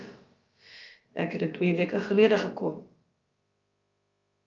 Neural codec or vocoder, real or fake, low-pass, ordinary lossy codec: codec, 16 kHz, about 1 kbps, DyCAST, with the encoder's durations; fake; 7.2 kHz; Opus, 24 kbps